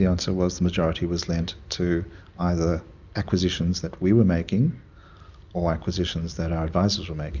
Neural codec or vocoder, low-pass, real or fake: none; 7.2 kHz; real